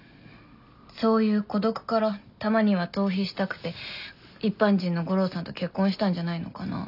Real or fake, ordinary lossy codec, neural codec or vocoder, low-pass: real; none; none; 5.4 kHz